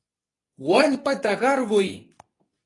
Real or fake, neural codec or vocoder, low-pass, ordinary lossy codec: fake; codec, 24 kHz, 0.9 kbps, WavTokenizer, medium speech release version 2; 10.8 kHz; AAC, 32 kbps